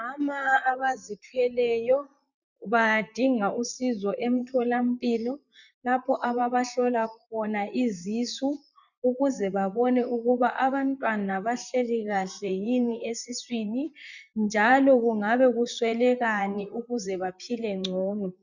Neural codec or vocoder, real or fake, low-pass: vocoder, 44.1 kHz, 128 mel bands, Pupu-Vocoder; fake; 7.2 kHz